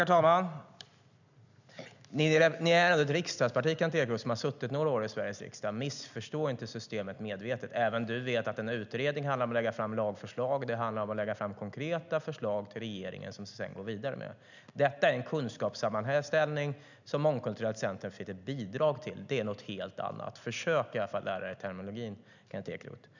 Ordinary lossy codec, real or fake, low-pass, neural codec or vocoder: none; real; 7.2 kHz; none